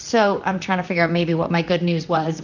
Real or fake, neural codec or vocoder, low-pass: fake; vocoder, 44.1 kHz, 128 mel bands, Pupu-Vocoder; 7.2 kHz